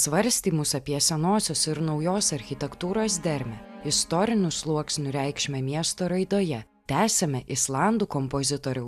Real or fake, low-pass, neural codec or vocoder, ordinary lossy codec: real; 14.4 kHz; none; AAC, 96 kbps